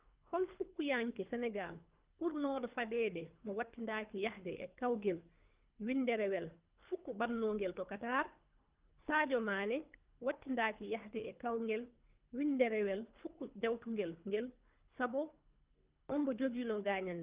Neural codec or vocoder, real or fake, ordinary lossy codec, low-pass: codec, 24 kHz, 3 kbps, HILCodec; fake; Opus, 24 kbps; 3.6 kHz